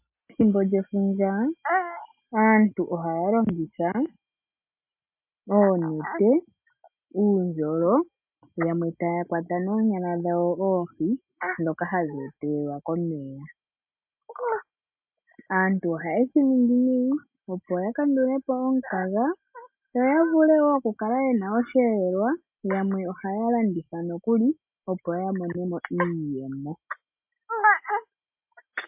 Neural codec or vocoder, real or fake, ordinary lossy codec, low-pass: none; real; MP3, 32 kbps; 3.6 kHz